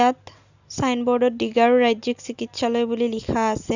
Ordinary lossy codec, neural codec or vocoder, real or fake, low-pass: none; none; real; 7.2 kHz